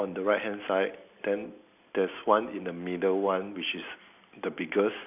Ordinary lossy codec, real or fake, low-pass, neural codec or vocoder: none; real; 3.6 kHz; none